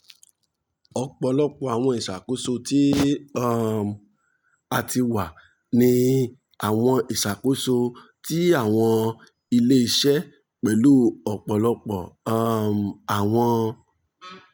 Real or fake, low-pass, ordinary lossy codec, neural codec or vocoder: real; none; none; none